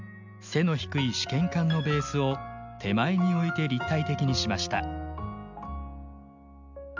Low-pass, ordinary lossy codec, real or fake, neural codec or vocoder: 7.2 kHz; MP3, 64 kbps; real; none